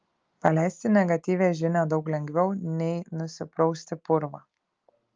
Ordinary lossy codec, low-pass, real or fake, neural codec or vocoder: Opus, 24 kbps; 7.2 kHz; real; none